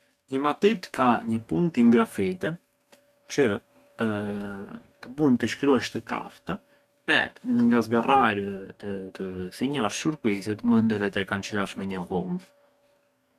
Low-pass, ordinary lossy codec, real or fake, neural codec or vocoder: 14.4 kHz; none; fake; codec, 44.1 kHz, 2.6 kbps, DAC